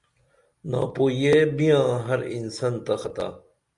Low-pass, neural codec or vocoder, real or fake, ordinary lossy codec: 10.8 kHz; none; real; Opus, 64 kbps